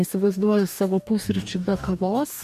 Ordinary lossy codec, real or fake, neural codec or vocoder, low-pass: MP3, 64 kbps; fake; codec, 44.1 kHz, 2.6 kbps, DAC; 14.4 kHz